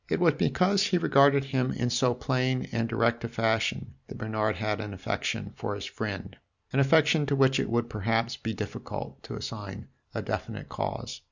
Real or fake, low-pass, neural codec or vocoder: real; 7.2 kHz; none